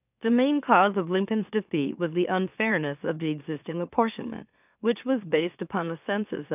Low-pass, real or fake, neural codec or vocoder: 3.6 kHz; fake; autoencoder, 44.1 kHz, a latent of 192 numbers a frame, MeloTTS